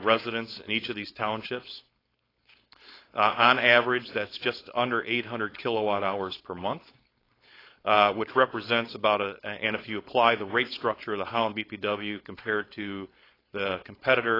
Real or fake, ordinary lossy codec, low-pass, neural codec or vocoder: fake; AAC, 24 kbps; 5.4 kHz; codec, 16 kHz, 4.8 kbps, FACodec